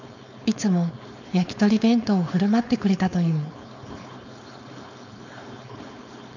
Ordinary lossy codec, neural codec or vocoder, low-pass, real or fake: none; codec, 16 kHz, 4.8 kbps, FACodec; 7.2 kHz; fake